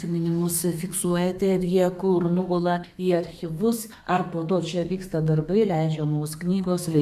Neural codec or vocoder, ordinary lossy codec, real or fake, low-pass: codec, 32 kHz, 1.9 kbps, SNAC; MP3, 96 kbps; fake; 14.4 kHz